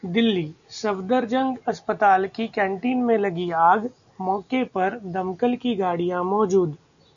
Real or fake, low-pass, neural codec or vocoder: real; 7.2 kHz; none